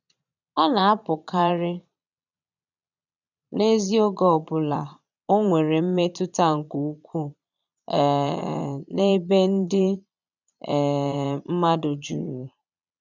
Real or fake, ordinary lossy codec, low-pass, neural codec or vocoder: fake; none; 7.2 kHz; vocoder, 22.05 kHz, 80 mel bands, Vocos